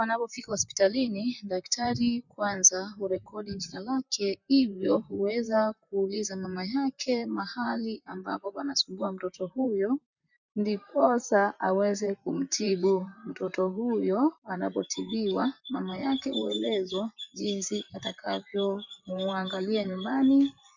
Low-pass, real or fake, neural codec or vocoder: 7.2 kHz; fake; vocoder, 44.1 kHz, 128 mel bands, Pupu-Vocoder